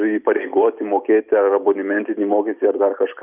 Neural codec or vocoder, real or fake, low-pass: vocoder, 44.1 kHz, 128 mel bands every 256 samples, BigVGAN v2; fake; 3.6 kHz